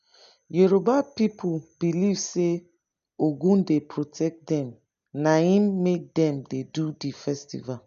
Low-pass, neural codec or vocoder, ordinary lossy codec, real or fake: 7.2 kHz; none; none; real